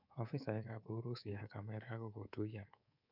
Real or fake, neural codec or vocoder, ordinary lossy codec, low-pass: fake; codec, 24 kHz, 3.1 kbps, DualCodec; none; 5.4 kHz